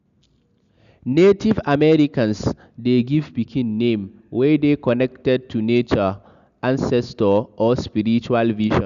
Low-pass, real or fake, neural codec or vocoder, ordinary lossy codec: 7.2 kHz; real; none; none